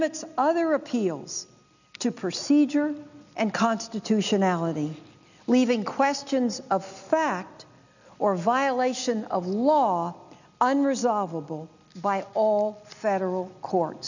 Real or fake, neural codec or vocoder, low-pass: real; none; 7.2 kHz